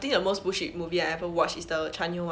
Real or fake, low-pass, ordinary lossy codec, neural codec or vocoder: real; none; none; none